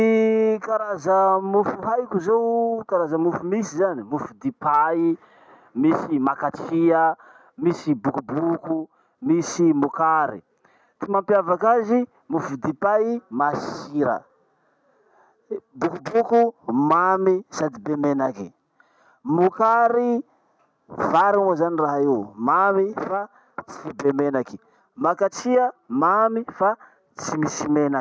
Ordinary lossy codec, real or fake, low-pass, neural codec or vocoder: none; real; none; none